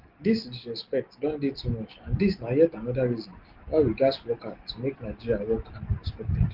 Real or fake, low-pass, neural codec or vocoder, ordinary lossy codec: real; 5.4 kHz; none; Opus, 16 kbps